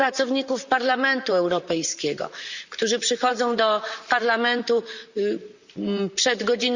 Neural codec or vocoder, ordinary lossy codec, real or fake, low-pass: vocoder, 44.1 kHz, 128 mel bands, Pupu-Vocoder; Opus, 64 kbps; fake; 7.2 kHz